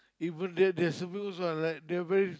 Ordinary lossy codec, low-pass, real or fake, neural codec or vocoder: none; none; real; none